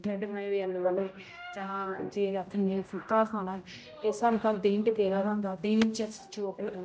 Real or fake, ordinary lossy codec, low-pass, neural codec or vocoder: fake; none; none; codec, 16 kHz, 0.5 kbps, X-Codec, HuBERT features, trained on general audio